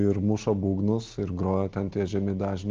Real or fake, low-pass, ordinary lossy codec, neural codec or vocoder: real; 7.2 kHz; Opus, 16 kbps; none